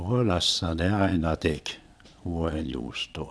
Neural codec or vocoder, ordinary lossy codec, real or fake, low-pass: vocoder, 22.05 kHz, 80 mel bands, Vocos; none; fake; none